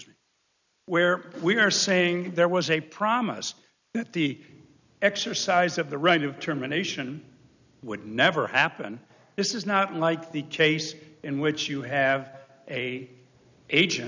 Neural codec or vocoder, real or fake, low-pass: none; real; 7.2 kHz